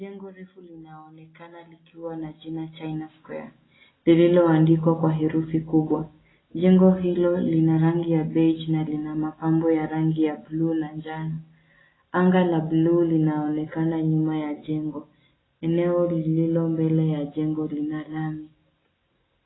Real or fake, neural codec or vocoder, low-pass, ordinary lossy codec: real; none; 7.2 kHz; AAC, 16 kbps